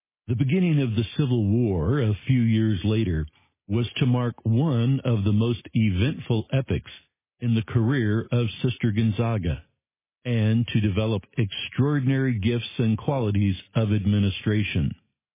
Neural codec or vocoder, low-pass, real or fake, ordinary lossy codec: none; 3.6 kHz; real; MP3, 16 kbps